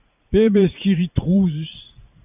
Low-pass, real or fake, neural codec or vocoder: 3.6 kHz; fake; vocoder, 44.1 kHz, 80 mel bands, Vocos